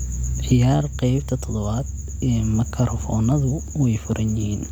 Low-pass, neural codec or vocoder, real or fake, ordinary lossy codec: 19.8 kHz; none; real; none